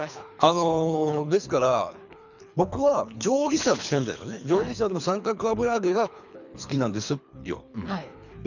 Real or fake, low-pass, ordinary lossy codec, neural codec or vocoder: fake; 7.2 kHz; none; codec, 24 kHz, 3 kbps, HILCodec